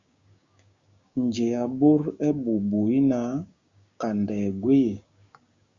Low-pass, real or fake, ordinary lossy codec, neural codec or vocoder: 7.2 kHz; fake; Opus, 64 kbps; codec, 16 kHz, 6 kbps, DAC